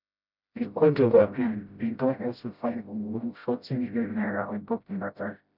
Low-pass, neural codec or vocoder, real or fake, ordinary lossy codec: 5.4 kHz; codec, 16 kHz, 0.5 kbps, FreqCodec, smaller model; fake; none